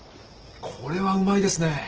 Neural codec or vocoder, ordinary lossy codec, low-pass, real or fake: none; Opus, 16 kbps; 7.2 kHz; real